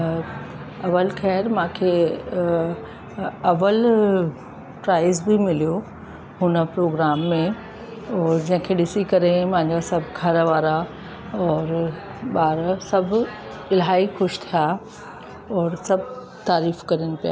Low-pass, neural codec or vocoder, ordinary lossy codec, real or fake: none; none; none; real